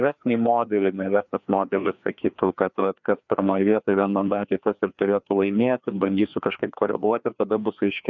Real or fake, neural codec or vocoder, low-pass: fake; codec, 16 kHz, 2 kbps, FreqCodec, larger model; 7.2 kHz